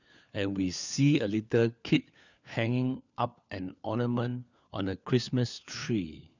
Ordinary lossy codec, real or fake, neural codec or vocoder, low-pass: none; fake; codec, 16 kHz, 4 kbps, FunCodec, trained on LibriTTS, 50 frames a second; 7.2 kHz